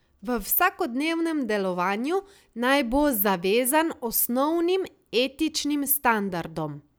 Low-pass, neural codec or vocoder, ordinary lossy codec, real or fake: none; none; none; real